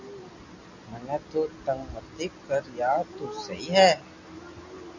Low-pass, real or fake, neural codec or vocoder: 7.2 kHz; real; none